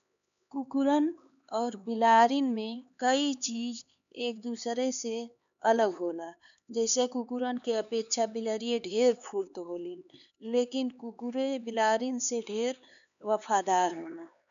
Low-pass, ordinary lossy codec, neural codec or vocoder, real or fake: 7.2 kHz; none; codec, 16 kHz, 4 kbps, X-Codec, HuBERT features, trained on LibriSpeech; fake